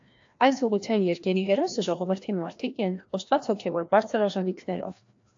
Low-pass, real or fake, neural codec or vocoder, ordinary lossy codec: 7.2 kHz; fake; codec, 16 kHz, 1 kbps, FreqCodec, larger model; AAC, 48 kbps